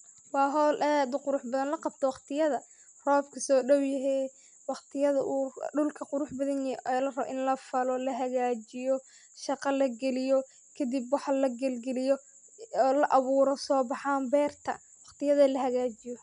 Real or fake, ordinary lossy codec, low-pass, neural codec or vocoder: real; none; 9.9 kHz; none